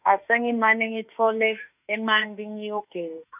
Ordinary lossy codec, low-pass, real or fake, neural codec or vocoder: none; 3.6 kHz; fake; autoencoder, 48 kHz, 32 numbers a frame, DAC-VAE, trained on Japanese speech